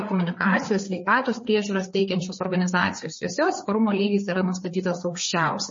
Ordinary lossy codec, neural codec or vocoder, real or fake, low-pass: MP3, 32 kbps; codec, 16 kHz, 4 kbps, FreqCodec, larger model; fake; 7.2 kHz